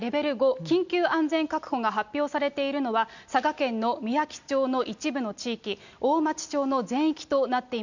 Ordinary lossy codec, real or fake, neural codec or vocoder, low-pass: none; real; none; 7.2 kHz